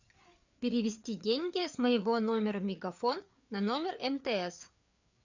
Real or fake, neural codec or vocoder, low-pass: fake; codec, 16 kHz, 4 kbps, FreqCodec, larger model; 7.2 kHz